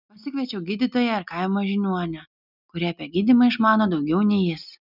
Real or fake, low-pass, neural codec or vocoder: real; 5.4 kHz; none